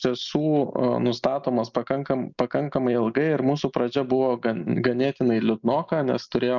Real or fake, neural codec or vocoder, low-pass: real; none; 7.2 kHz